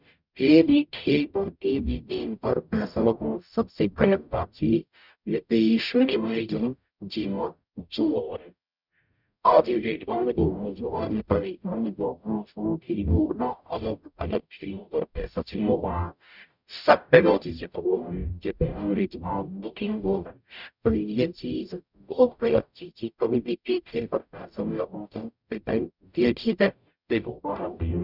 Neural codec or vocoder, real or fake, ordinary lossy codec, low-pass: codec, 44.1 kHz, 0.9 kbps, DAC; fake; none; 5.4 kHz